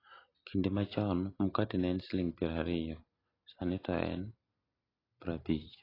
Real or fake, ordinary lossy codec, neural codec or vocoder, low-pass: real; AAC, 24 kbps; none; 5.4 kHz